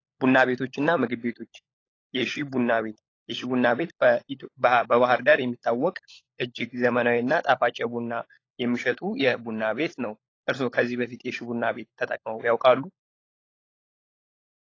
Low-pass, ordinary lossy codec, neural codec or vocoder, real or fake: 7.2 kHz; AAC, 32 kbps; codec, 16 kHz, 16 kbps, FunCodec, trained on LibriTTS, 50 frames a second; fake